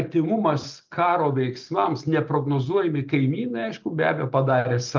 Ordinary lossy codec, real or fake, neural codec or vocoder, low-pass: Opus, 32 kbps; real; none; 7.2 kHz